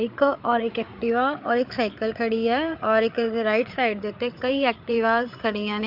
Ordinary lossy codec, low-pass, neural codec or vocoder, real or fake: MP3, 48 kbps; 5.4 kHz; codec, 16 kHz, 8 kbps, FreqCodec, larger model; fake